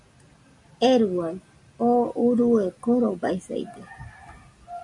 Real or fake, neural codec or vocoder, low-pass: real; none; 10.8 kHz